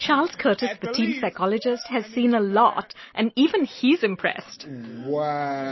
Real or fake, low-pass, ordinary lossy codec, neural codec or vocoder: real; 7.2 kHz; MP3, 24 kbps; none